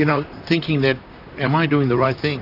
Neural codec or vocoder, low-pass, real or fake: vocoder, 44.1 kHz, 128 mel bands, Pupu-Vocoder; 5.4 kHz; fake